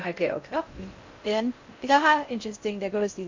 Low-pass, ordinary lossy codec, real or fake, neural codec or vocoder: 7.2 kHz; MP3, 48 kbps; fake; codec, 16 kHz in and 24 kHz out, 0.6 kbps, FocalCodec, streaming, 4096 codes